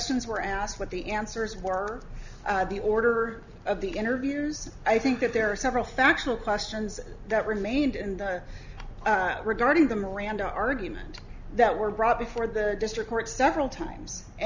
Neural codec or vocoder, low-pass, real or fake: none; 7.2 kHz; real